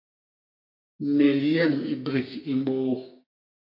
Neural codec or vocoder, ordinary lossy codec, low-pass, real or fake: codec, 32 kHz, 1.9 kbps, SNAC; MP3, 32 kbps; 5.4 kHz; fake